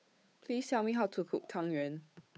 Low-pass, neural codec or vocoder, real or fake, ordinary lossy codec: none; codec, 16 kHz, 8 kbps, FunCodec, trained on Chinese and English, 25 frames a second; fake; none